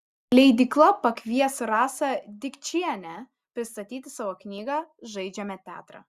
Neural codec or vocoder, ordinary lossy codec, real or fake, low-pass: none; Opus, 64 kbps; real; 14.4 kHz